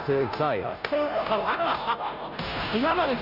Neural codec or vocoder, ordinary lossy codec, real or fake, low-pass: codec, 16 kHz, 0.5 kbps, FunCodec, trained on Chinese and English, 25 frames a second; none; fake; 5.4 kHz